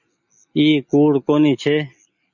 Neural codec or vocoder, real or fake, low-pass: none; real; 7.2 kHz